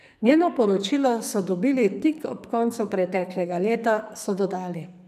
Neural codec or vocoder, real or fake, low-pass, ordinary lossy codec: codec, 44.1 kHz, 2.6 kbps, SNAC; fake; 14.4 kHz; none